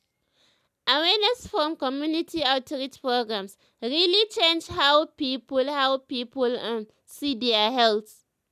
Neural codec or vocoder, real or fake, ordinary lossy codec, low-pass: none; real; none; 14.4 kHz